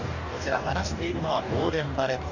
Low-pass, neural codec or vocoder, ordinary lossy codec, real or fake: 7.2 kHz; codec, 44.1 kHz, 2.6 kbps, DAC; none; fake